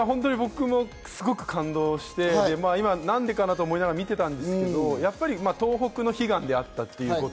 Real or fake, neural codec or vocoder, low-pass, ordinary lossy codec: real; none; none; none